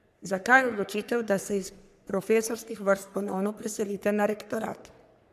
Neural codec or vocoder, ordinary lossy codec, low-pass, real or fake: codec, 44.1 kHz, 3.4 kbps, Pupu-Codec; none; 14.4 kHz; fake